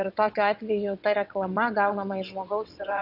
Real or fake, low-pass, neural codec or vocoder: fake; 5.4 kHz; vocoder, 22.05 kHz, 80 mel bands, WaveNeXt